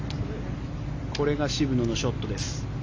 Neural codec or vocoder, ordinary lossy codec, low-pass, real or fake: none; AAC, 48 kbps; 7.2 kHz; real